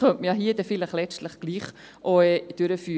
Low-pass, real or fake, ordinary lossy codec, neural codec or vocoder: none; real; none; none